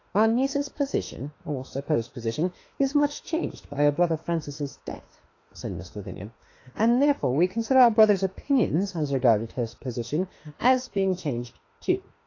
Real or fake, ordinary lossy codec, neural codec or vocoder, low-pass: fake; AAC, 32 kbps; autoencoder, 48 kHz, 32 numbers a frame, DAC-VAE, trained on Japanese speech; 7.2 kHz